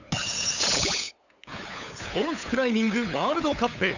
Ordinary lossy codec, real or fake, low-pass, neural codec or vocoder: none; fake; 7.2 kHz; codec, 16 kHz, 8 kbps, FunCodec, trained on LibriTTS, 25 frames a second